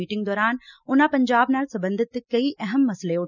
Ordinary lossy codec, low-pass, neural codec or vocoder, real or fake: none; 7.2 kHz; none; real